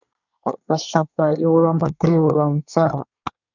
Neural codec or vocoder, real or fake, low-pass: codec, 24 kHz, 1 kbps, SNAC; fake; 7.2 kHz